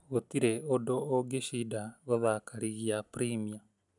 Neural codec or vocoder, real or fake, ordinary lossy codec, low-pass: none; real; none; 10.8 kHz